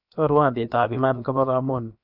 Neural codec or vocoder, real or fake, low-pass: codec, 16 kHz, about 1 kbps, DyCAST, with the encoder's durations; fake; 5.4 kHz